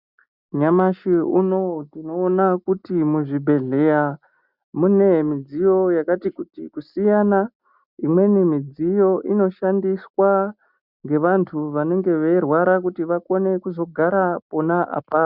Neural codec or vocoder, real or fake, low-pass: none; real; 5.4 kHz